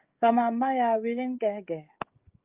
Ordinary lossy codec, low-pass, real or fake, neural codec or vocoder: Opus, 32 kbps; 3.6 kHz; fake; codec, 16 kHz, 16 kbps, FreqCodec, smaller model